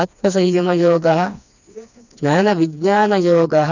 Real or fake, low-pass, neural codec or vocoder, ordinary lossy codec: fake; 7.2 kHz; codec, 16 kHz, 2 kbps, FreqCodec, smaller model; none